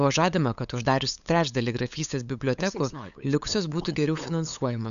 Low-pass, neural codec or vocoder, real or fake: 7.2 kHz; codec, 16 kHz, 8 kbps, FunCodec, trained on LibriTTS, 25 frames a second; fake